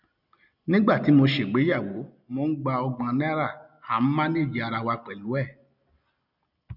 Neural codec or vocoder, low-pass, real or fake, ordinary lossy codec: none; 5.4 kHz; real; none